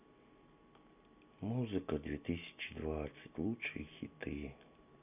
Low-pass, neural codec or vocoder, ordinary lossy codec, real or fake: 3.6 kHz; none; none; real